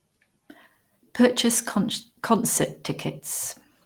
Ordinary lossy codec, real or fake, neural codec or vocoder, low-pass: Opus, 24 kbps; real; none; 19.8 kHz